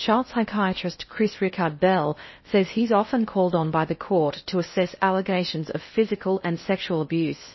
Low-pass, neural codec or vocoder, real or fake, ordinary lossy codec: 7.2 kHz; codec, 16 kHz in and 24 kHz out, 0.6 kbps, FocalCodec, streaming, 2048 codes; fake; MP3, 24 kbps